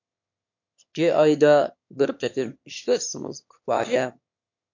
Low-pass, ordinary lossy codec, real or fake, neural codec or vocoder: 7.2 kHz; MP3, 48 kbps; fake; autoencoder, 22.05 kHz, a latent of 192 numbers a frame, VITS, trained on one speaker